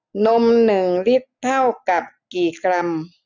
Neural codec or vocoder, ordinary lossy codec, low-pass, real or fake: none; none; 7.2 kHz; real